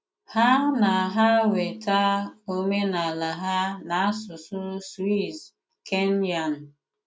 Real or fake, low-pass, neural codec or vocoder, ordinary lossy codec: real; none; none; none